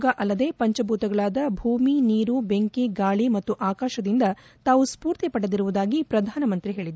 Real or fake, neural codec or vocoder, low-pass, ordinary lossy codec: real; none; none; none